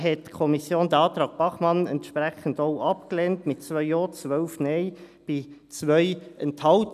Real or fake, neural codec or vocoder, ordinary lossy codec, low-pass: real; none; none; 14.4 kHz